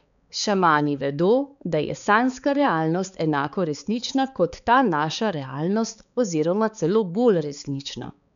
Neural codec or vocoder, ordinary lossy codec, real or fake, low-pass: codec, 16 kHz, 4 kbps, X-Codec, HuBERT features, trained on balanced general audio; none; fake; 7.2 kHz